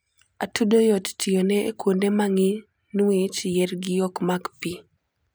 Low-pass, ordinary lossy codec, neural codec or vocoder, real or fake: none; none; vocoder, 44.1 kHz, 128 mel bands every 256 samples, BigVGAN v2; fake